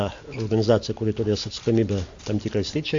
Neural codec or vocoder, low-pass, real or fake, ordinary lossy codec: none; 7.2 kHz; real; AAC, 64 kbps